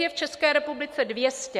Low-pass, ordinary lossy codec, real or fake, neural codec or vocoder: 14.4 kHz; MP3, 64 kbps; real; none